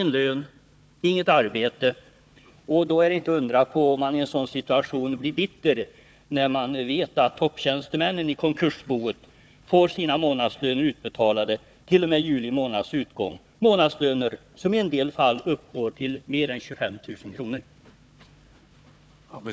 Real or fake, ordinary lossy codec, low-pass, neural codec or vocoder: fake; none; none; codec, 16 kHz, 4 kbps, FunCodec, trained on Chinese and English, 50 frames a second